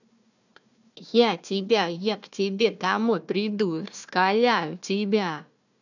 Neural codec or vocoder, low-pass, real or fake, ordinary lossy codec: codec, 16 kHz, 1 kbps, FunCodec, trained on Chinese and English, 50 frames a second; 7.2 kHz; fake; none